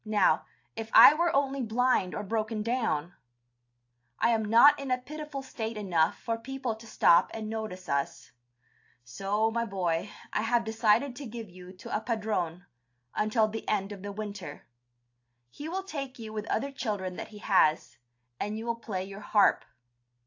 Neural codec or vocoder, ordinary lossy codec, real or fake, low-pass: none; AAC, 48 kbps; real; 7.2 kHz